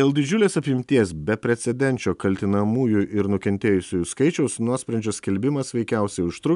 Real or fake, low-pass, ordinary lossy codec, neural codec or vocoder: real; 10.8 kHz; MP3, 96 kbps; none